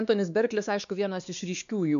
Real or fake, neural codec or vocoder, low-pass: fake; codec, 16 kHz, 2 kbps, X-Codec, WavLM features, trained on Multilingual LibriSpeech; 7.2 kHz